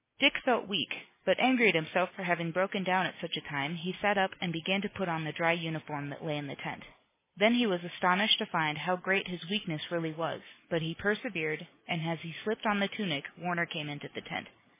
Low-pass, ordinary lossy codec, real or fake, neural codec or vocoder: 3.6 kHz; MP3, 16 kbps; real; none